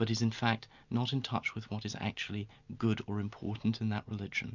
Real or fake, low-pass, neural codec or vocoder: real; 7.2 kHz; none